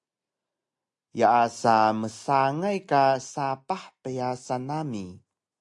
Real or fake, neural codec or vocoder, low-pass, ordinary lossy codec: real; none; 10.8 kHz; AAC, 64 kbps